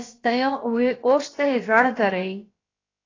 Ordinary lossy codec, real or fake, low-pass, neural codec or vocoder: AAC, 32 kbps; fake; 7.2 kHz; codec, 16 kHz, about 1 kbps, DyCAST, with the encoder's durations